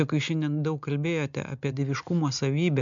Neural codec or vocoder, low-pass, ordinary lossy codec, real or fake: none; 7.2 kHz; MP3, 64 kbps; real